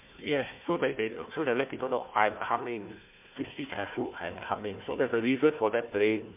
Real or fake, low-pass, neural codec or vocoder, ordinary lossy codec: fake; 3.6 kHz; codec, 16 kHz, 1 kbps, FunCodec, trained on Chinese and English, 50 frames a second; MP3, 32 kbps